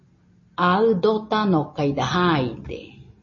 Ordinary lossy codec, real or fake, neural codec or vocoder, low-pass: MP3, 32 kbps; real; none; 7.2 kHz